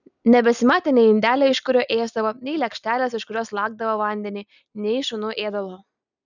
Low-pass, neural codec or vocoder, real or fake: 7.2 kHz; none; real